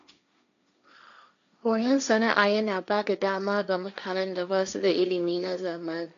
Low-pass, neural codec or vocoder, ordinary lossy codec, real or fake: 7.2 kHz; codec, 16 kHz, 1.1 kbps, Voila-Tokenizer; none; fake